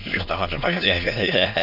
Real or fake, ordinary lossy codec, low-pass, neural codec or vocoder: fake; none; 5.4 kHz; autoencoder, 22.05 kHz, a latent of 192 numbers a frame, VITS, trained on many speakers